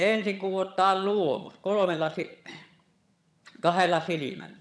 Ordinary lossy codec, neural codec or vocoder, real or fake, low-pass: none; vocoder, 22.05 kHz, 80 mel bands, HiFi-GAN; fake; none